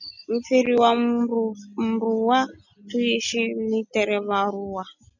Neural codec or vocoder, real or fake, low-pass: none; real; 7.2 kHz